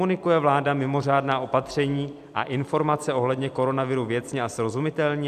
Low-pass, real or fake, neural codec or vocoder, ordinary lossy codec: 14.4 kHz; fake; vocoder, 44.1 kHz, 128 mel bands every 256 samples, BigVGAN v2; AAC, 96 kbps